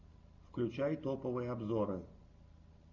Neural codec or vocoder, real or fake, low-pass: none; real; 7.2 kHz